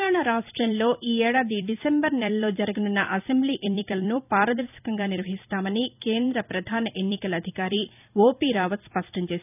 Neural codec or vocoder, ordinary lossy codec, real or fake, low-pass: vocoder, 44.1 kHz, 128 mel bands every 256 samples, BigVGAN v2; none; fake; 3.6 kHz